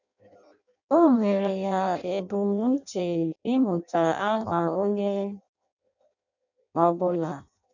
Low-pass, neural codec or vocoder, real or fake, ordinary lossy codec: 7.2 kHz; codec, 16 kHz in and 24 kHz out, 0.6 kbps, FireRedTTS-2 codec; fake; none